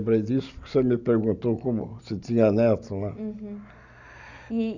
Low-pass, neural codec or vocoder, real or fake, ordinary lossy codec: 7.2 kHz; none; real; none